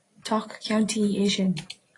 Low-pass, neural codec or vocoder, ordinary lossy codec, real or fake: 10.8 kHz; none; AAC, 32 kbps; real